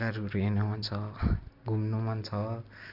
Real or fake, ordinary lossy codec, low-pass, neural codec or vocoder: real; none; 5.4 kHz; none